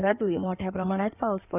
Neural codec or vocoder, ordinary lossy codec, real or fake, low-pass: codec, 16 kHz in and 24 kHz out, 2.2 kbps, FireRedTTS-2 codec; none; fake; 3.6 kHz